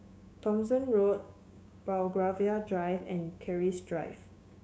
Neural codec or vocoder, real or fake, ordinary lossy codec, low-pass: codec, 16 kHz, 6 kbps, DAC; fake; none; none